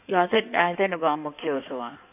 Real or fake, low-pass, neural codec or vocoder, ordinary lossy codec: fake; 3.6 kHz; codec, 16 kHz in and 24 kHz out, 1.1 kbps, FireRedTTS-2 codec; none